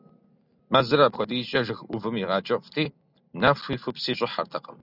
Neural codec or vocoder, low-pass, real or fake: none; 5.4 kHz; real